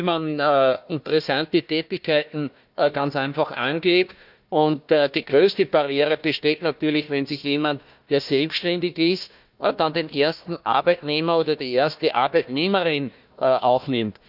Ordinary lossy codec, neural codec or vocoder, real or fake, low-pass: none; codec, 16 kHz, 1 kbps, FunCodec, trained on Chinese and English, 50 frames a second; fake; 5.4 kHz